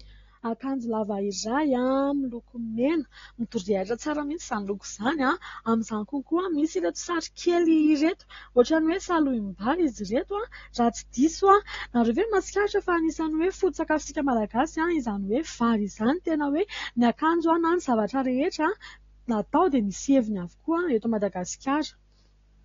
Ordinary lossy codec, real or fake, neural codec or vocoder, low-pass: AAC, 32 kbps; real; none; 7.2 kHz